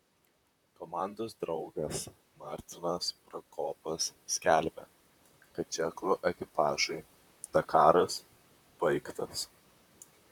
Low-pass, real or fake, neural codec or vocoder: 19.8 kHz; fake; vocoder, 44.1 kHz, 128 mel bands, Pupu-Vocoder